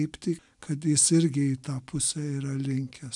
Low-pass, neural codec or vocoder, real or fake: 10.8 kHz; none; real